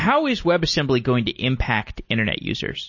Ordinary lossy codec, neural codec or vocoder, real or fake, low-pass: MP3, 32 kbps; none; real; 7.2 kHz